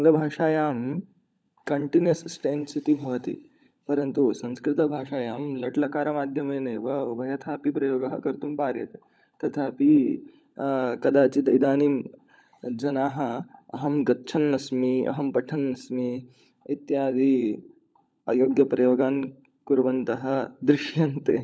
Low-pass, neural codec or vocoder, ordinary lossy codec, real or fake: none; codec, 16 kHz, 16 kbps, FunCodec, trained on LibriTTS, 50 frames a second; none; fake